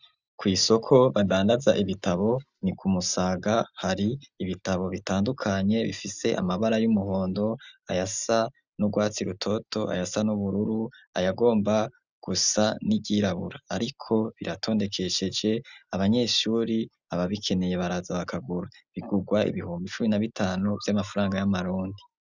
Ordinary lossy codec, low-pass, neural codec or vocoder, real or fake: Opus, 64 kbps; 7.2 kHz; none; real